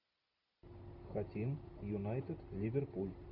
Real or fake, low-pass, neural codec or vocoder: real; 5.4 kHz; none